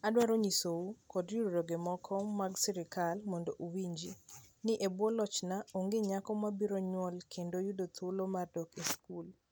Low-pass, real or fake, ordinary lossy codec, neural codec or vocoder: none; real; none; none